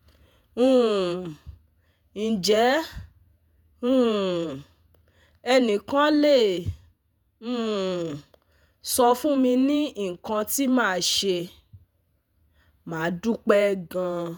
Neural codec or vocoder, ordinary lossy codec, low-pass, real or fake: vocoder, 48 kHz, 128 mel bands, Vocos; none; none; fake